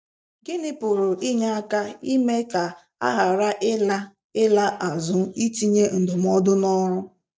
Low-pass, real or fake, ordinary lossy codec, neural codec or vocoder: none; real; none; none